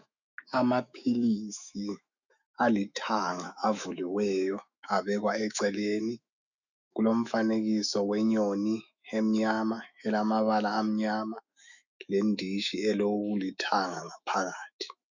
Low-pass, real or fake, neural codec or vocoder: 7.2 kHz; fake; autoencoder, 48 kHz, 128 numbers a frame, DAC-VAE, trained on Japanese speech